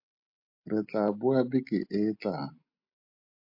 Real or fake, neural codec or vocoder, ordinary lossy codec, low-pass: real; none; MP3, 32 kbps; 5.4 kHz